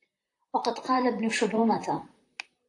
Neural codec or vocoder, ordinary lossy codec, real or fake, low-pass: vocoder, 44.1 kHz, 128 mel bands, Pupu-Vocoder; AAC, 48 kbps; fake; 10.8 kHz